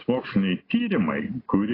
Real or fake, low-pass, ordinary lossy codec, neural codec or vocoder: real; 5.4 kHz; AAC, 24 kbps; none